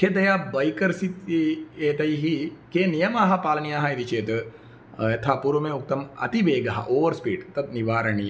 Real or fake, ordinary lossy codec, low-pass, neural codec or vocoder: real; none; none; none